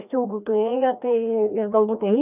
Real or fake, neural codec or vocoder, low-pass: fake; codec, 16 kHz, 1 kbps, FreqCodec, larger model; 3.6 kHz